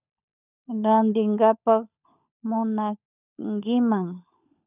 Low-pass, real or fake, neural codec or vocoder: 3.6 kHz; fake; codec, 16 kHz, 16 kbps, FunCodec, trained on LibriTTS, 50 frames a second